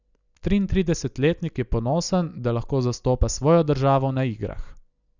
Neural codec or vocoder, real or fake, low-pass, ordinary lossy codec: none; real; 7.2 kHz; none